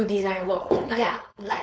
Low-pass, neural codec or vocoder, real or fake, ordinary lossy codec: none; codec, 16 kHz, 4.8 kbps, FACodec; fake; none